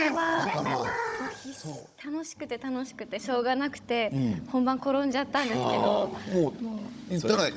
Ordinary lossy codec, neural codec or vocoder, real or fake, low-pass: none; codec, 16 kHz, 16 kbps, FunCodec, trained on Chinese and English, 50 frames a second; fake; none